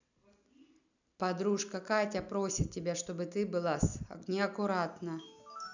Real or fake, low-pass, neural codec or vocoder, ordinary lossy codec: real; 7.2 kHz; none; none